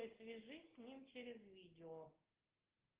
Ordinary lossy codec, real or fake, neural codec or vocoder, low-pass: Opus, 16 kbps; real; none; 3.6 kHz